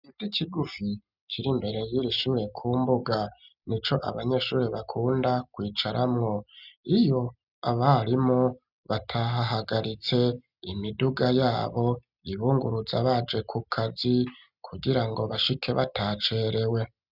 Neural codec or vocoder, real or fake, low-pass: none; real; 5.4 kHz